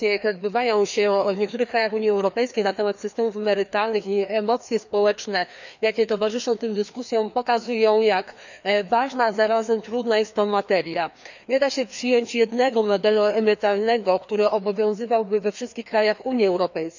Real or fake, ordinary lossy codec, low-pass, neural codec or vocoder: fake; none; 7.2 kHz; codec, 16 kHz, 2 kbps, FreqCodec, larger model